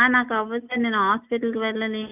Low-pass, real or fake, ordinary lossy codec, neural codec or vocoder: 3.6 kHz; real; none; none